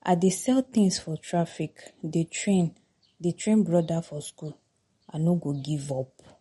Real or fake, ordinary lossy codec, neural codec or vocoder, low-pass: real; MP3, 48 kbps; none; 19.8 kHz